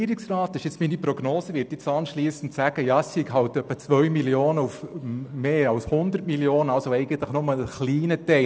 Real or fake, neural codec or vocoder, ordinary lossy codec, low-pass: real; none; none; none